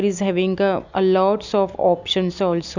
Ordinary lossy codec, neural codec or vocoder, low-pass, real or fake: none; none; 7.2 kHz; real